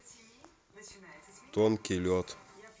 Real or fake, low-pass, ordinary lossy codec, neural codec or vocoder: real; none; none; none